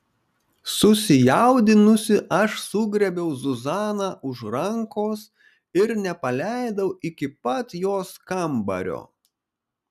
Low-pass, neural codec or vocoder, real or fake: 14.4 kHz; none; real